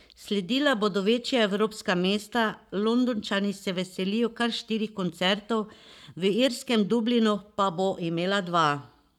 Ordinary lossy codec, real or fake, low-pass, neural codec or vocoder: none; fake; 19.8 kHz; codec, 44.1 kHz, 7.8 kbps, Pupu-Codec